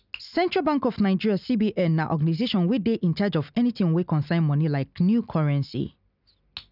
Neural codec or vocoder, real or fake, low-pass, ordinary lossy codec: none; real; 5.4 kHz; none